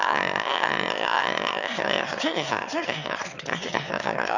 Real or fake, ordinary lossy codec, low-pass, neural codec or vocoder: fake; none; 7.2 kHz; autoencoder, 22.05 kHz, a latent of 192 numbers a frame, VITS, trained on one speaker